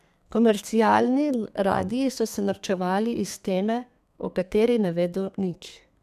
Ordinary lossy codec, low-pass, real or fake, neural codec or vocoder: none; 14.4 kHz; fake; codec, 32 kHz, 1.9 kbps, SNAC